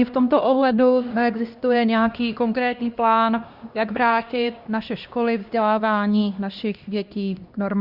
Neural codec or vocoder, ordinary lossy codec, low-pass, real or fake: codec, 16 kHz, 1 kbps, X-Codec, HuBERT features, trained on LibriSpeech; Opus, 64 kbps; 5.4 kHz; fake